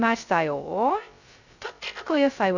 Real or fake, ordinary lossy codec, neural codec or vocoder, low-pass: fake; none; codec, 16 kHz, 0.2 kbps, FocalCodec; 7.2 kHz